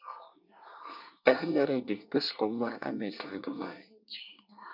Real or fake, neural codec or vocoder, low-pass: fake; codec, 24 kHz, 1 kbps, SNAC; 5.4 kHz